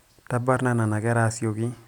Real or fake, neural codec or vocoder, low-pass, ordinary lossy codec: real; none; 19.8 kHz; none